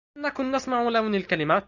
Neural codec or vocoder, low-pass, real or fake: none; 7.2 kHz; real